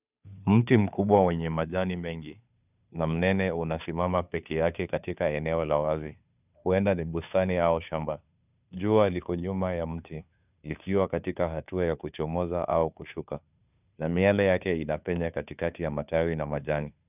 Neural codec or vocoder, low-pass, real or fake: codec, 16 kHz, 2 kbps, FunCodec, trained on Chinese and English, 25 frames a second; 3.6 kHz; fake